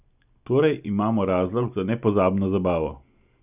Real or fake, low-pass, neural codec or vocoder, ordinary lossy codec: real; 3.6 kHz; none; none